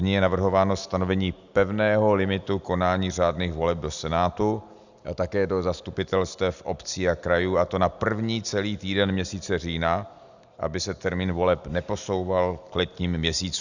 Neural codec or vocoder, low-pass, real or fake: none; 7.2 kHz; real